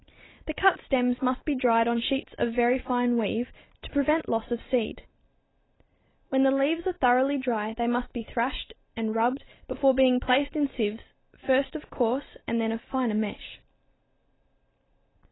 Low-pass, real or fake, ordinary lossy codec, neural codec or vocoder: 7.2 kHz; real; AAC, 16 kbps; none